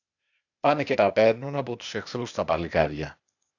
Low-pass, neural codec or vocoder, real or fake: 7.2 kHz; codec, 16 kHz, 0.8 kbps, ZipCodec; fake